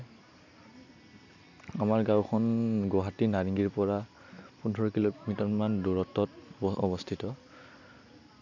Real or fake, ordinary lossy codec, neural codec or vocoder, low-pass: real; none; none; 7.2 kHz